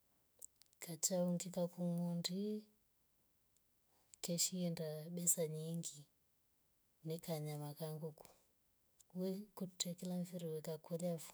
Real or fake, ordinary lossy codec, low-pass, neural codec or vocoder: fake; none; none; autoencoder, 48 kHz, 128 numbers a frame, DAC-VAE, trained on Japanese speech